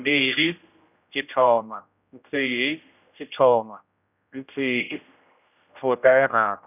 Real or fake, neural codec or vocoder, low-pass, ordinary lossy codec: fake; codec, 16 kHz, 0.5 kbps, X-Codec, HuBERT features, trained on general audio; 3.6 kHz; none